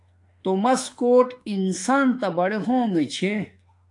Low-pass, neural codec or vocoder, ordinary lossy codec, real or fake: 10.8 kHz; autoencoder, 48 kHz, 32 numbers a frame, DAC-VAE, trained on Japanese speech; AAC, 64 kbps; fake